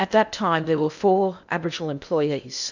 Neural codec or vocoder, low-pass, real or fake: codec, 16 kHz in and 24 kHz out, 0.8 kbps, FocalCodec, streaming, 65536 codes; 7.2 kHz; fake